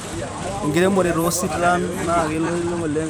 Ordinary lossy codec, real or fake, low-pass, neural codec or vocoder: none; fake; none; vocoder, 44.1 kHz, 128 mel bands every 512 samples, BigVGAN v2